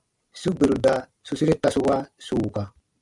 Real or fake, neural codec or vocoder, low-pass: fake; vocoder, 44.1 kHz, 128 mel bands every 256 samples, BigVGAN v2; 10.8 kHz